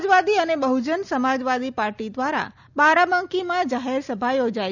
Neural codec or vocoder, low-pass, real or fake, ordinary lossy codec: none; 7.2 kHz; real; none